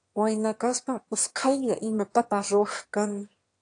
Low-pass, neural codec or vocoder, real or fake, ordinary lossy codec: 9.9 kHz; autoencoder, 22.05 kHz, a latent of 192 numbers a frame, VITS, trained on one speaker; fake; AAC, 48 kbps